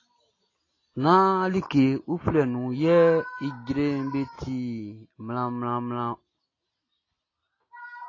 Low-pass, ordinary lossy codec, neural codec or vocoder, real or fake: 7.2 kHz; AAC, 32 kbps; none; real